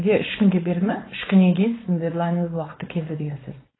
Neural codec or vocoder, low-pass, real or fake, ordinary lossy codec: codec, 16 kHz, 4.8 kbps, FACodec; 7.2 kHz; fake; AAC, 16 kbps